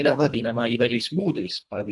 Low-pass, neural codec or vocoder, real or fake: 10.8 kHz; codec, 24 kHz, 1.5 kbps, HILCodec; fake